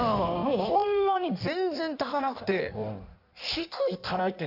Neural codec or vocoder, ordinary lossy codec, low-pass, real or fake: codec, 16 kHz in and 24 kHz out, 1.1 kbps, FireRedTTS-2 codec; none; 5.4 kHz; fake